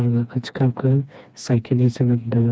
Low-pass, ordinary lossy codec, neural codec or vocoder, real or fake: none; none; codec, 16 kHz, 2 kbps, FreqCodec, smaller model; fake